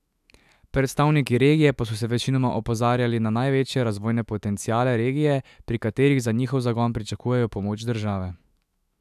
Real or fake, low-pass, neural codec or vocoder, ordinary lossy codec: fake; 14.4 kHz; autoencoder, 48 kHz, 128 numbers a frame, DAC-VAE, trained on Japanese speech; none